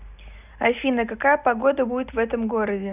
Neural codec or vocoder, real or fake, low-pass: none; real; 3.6 kHz